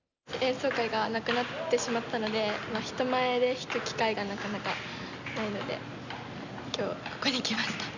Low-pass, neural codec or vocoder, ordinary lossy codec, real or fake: 7.2 kHz; none; none; real